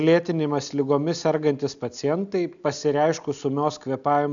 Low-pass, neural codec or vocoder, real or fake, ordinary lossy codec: 7.2 kHz; none; real; MP3, 96 kbps